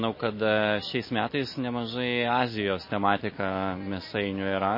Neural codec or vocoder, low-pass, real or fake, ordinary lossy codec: none; 5.4 kHz; real; MP3, 24 kbps